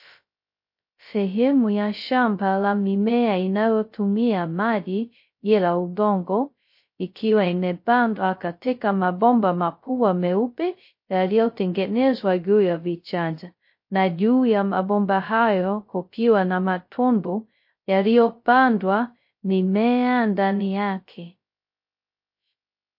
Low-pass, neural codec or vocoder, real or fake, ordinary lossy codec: 5.4 kHz; codec, 16 kHz, 0.2 kbps, FocalCodec; fake; MP3, 32 kbps